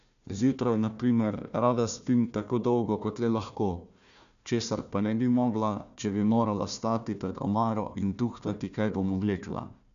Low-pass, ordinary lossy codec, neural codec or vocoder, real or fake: 7.2 kHz; none; codec, 16 kHz, 1 kbps, FunCodec, trained on Chinese and English, 50 frames a second; fake